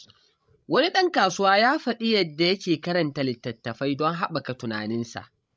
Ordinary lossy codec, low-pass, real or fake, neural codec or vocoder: none; none; fake; codec, 16 kHz, 16 kbps, FreqCodec, larger model